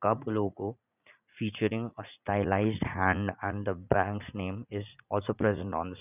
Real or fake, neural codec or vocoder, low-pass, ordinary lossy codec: fake; vocoder, 44.1 kHz, 80 mel bands, Vocos; 3.6 kHz; none